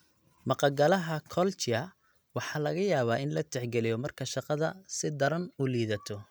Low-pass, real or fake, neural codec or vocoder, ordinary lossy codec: none; real; none; none